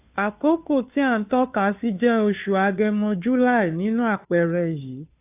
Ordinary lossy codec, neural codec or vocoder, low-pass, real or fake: AAC, 32 kbps; codec, 16 kHz, 2 kbps, FunCodec, trained on Chinese and English, 25 frames a second; 3.6 kHz; fake